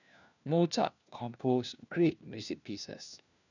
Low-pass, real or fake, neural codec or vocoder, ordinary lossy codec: 7.2 kHz; fake; codec, 16 kHz, 1 kbps, FunCodec, trained on LibriTTS, 50 frames a second; none